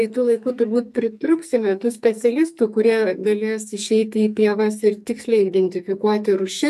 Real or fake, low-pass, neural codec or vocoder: fake; 14.4 kHz; codec, 44.1 kHz, 2.6 kbps, SNAC